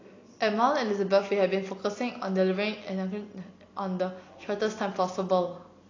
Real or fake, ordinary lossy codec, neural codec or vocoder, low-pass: real; AAC, 32 kbps; none; 7.2 kHz